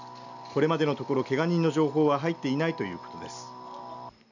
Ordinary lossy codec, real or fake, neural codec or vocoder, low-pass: none; real; none; 7.2 kHz